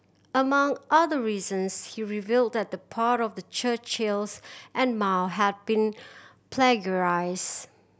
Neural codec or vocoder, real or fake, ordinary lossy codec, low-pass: none; real; none; none